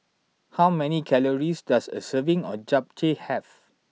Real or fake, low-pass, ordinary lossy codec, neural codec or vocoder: real; none; none; none